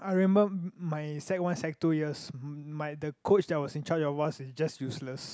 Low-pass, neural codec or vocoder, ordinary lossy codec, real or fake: none; none; none; real